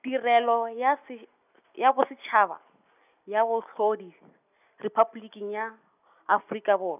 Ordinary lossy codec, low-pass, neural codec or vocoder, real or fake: none; 3.6 kHz; none; real